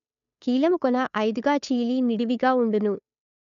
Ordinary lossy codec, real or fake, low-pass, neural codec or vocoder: none; fake; 7.2 kHz; codec, 16 kHz, 2 kbps, FunCodec, trained on Chinese and English, 25 frames a second